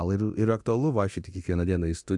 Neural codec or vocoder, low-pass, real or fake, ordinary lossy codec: codec, 24 kHz, 0.9 kbps, DualCodec; 10.8 kHz; fake; AAC, 64 kbps